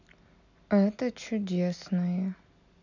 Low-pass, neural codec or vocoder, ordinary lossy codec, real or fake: 7.2 kHz; none; none; real